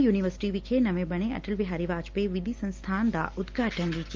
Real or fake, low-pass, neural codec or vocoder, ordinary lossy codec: real; 7.2 kHz; none; Opus, 32 kbps